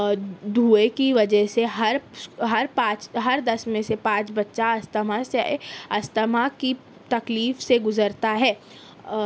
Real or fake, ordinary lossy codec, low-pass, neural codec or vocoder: real; none; none; none